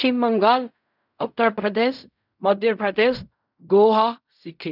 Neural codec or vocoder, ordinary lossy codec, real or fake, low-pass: codec, 16 kHz in and 24 kHz out, 0.4 kbps, LongCat-Audio-Codec, fine tuned four codebook decoder; none; fake; 5.4 kHz